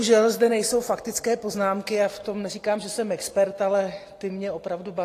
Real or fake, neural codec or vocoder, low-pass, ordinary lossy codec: real; none; 14.4 kHz; AAC, 48 kbps